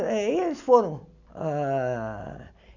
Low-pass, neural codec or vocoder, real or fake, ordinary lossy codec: 7.2 kHz; none; real; none